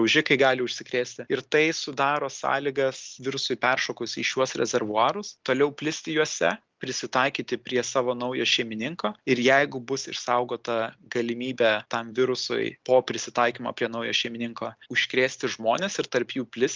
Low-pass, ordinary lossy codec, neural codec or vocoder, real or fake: 7.2 kHz; Opus, 32 kbps; none; real